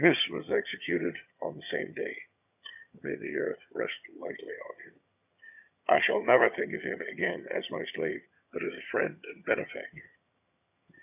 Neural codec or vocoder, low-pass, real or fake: vocoder, 22.05 kHz, 80 mel bands, HiFi-GAN; 3.6 kHz; fake